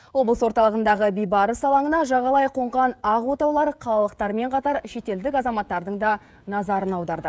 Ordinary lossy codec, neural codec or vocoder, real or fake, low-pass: none; codec, 16 kHz, 16 kbps, FreqCodec, smaller model; fake; none